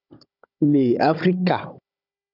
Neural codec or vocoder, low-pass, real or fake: codec, 16 kHz, 16 kbps, FunCodec, trained on Chinese and English, 50 frames a second; 5.4 kHz; fake